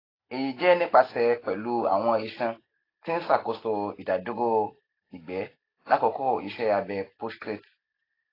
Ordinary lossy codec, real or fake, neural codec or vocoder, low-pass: AAC, 24 kbps; real; none; 5.4 kHz